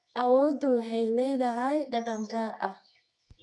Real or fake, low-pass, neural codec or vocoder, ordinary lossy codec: fake; 10.8 kHz; codec, 24 kHz, 0.9 kbps, WavTokenizer, medium music audio release; none